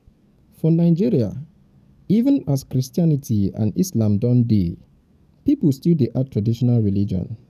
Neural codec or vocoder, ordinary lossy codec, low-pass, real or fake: codec, 44.1 kHz, 7.8 kbps, DAC; none; 14.4 kHz; fake